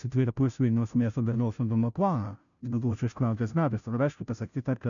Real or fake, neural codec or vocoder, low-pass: fake; codec, 16 kHz, 0.5 kbps, FunCodec, trained on Chinese and English, 25 frames a second; 7.2 kHz